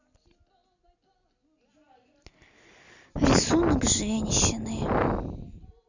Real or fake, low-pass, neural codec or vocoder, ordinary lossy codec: real; 7.2 kHz; none; none